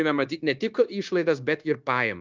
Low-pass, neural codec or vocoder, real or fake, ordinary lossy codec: 7.2 kHz; codec, 16 kHz, 0.9 kbps, LongCat-Audio-Codec; fake; Opus, 24 kbps